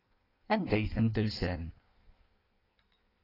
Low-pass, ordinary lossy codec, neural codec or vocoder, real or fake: 5.4 kHz; AAC, 24 kbps; codec, 16 kHz in and 24 kHz out, 1.1 kbps, FireRedTTS-2 codec; fake